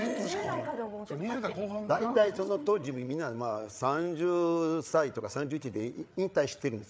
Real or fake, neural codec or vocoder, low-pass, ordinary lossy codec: fake; codec, 16 kHz, 8 kbps, FreqCodec, larger model; none; none